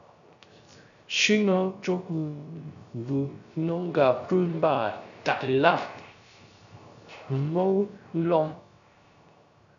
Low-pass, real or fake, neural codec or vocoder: 7.2 kHz; fake; codec, 16 kHz, 0.3 kbps, FocalCodec